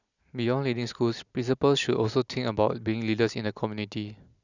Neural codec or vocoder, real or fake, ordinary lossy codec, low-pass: none; real; none; 7.2 kHz